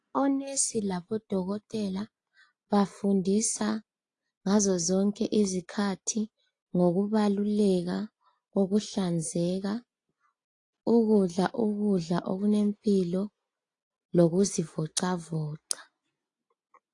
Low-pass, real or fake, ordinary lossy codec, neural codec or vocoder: 10.8 kHz; real; AAC, 48 kbps; none